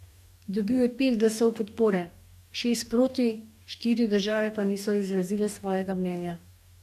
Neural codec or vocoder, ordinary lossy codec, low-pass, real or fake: codec, 44.1 kHz, 2.6 kbps, DAC; none; 14.4 kHz; fake